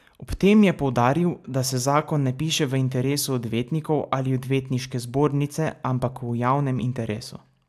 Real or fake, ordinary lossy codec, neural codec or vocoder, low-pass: real; AAC, 96 kbps; none; 14.4 kHz